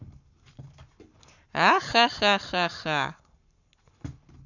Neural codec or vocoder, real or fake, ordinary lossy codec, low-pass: codec, 44.1 kHz, 7.8 kbps, Pupu-Codec; fake; none; 7.2 kHz